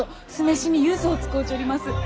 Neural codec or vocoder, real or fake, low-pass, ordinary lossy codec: none; real; none; none